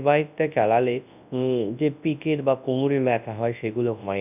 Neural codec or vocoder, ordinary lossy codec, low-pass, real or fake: codec, 24 kHz, 0.9 kbps, WavTokenizer, large speech release; none; 3.6 kHz; fake